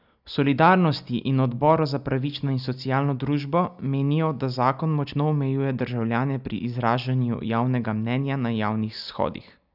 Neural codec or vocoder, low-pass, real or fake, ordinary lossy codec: none; 5.4 kHz; real; none